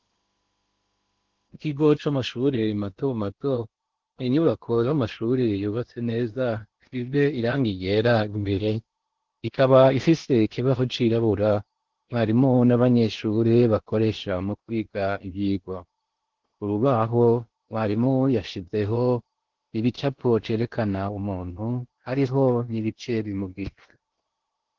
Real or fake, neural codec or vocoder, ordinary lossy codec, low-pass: fake; codec, 16 kHz in and 24 kHz out, 0.8 kbps, FocalCodec, streaming, 65536 codes; Opus, 16 kbps; 7.2 kHz